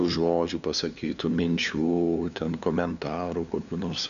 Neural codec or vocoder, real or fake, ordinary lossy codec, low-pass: codec, 16 kHz, 2 kbps, FunCodec, trained on LibriTTS, 25 frames a second; fake; MP3, 96 kbps; 7.2 kHz